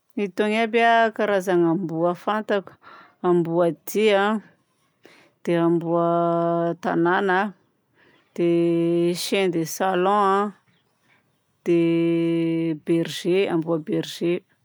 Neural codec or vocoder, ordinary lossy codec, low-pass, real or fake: none; none; none; real